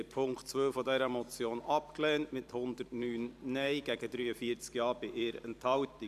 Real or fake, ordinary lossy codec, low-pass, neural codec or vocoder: fake; none; 14.4 kHz; vocoder, 44.1 kHz, 128 mel bands every 512 samples, BigVGAN v2